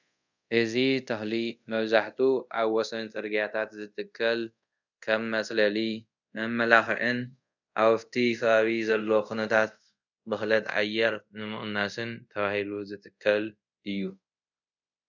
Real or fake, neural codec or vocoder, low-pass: fake; codec, 24 kHz, 0.5 kbps, DualCodec; 7.2 kHz